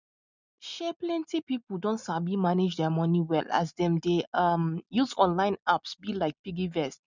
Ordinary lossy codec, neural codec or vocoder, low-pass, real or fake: none; none; 7.2 kHz; real